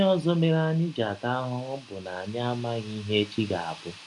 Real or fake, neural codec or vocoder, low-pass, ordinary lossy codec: real; none; 10.8 kHz; none